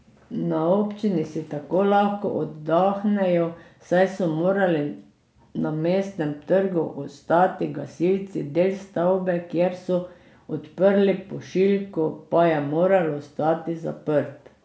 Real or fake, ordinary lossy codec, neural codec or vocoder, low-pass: real; none; none; none